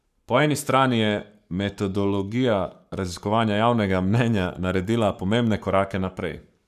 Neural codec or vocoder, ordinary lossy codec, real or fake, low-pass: codec, 44.1 kHz, 7.8 kbps, Pupu-Codec; none; fake; 14.4 kHz